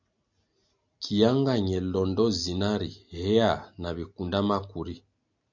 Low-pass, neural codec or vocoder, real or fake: 7.2 kHz; none; real